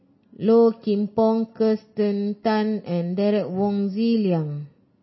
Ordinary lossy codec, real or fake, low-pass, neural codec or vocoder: MP3, 24 kbps; real; 7.2 kHz; none